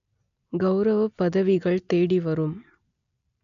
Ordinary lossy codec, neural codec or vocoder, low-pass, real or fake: none; none; 7.2 kHz; real